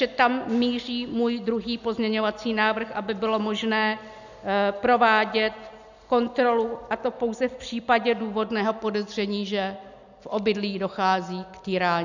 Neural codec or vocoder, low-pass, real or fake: none; 7.2 kHz; real